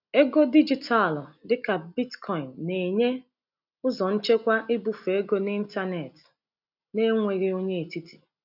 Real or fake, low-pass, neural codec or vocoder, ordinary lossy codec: real; 5.4 kHz; none; none